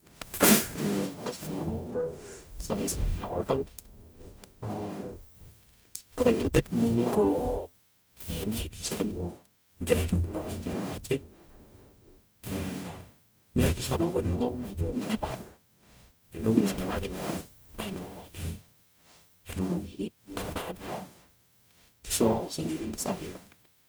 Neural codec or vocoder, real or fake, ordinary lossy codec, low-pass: codec, 44.1 kHz, 0.9 kbps, DAC; fake; none; none